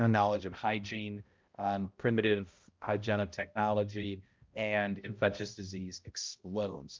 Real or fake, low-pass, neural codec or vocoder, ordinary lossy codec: fake; 7.2 kHz; codec, 16 kHz, 0.5 kbps, X-Codec, HuBERT features, trained on balanced general audio; Opus, 24 kbps